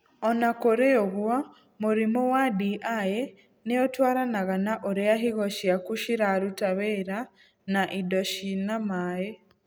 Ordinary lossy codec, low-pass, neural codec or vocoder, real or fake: none; none; none; real